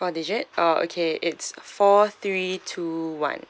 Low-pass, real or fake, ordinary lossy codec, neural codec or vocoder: none; real; none; none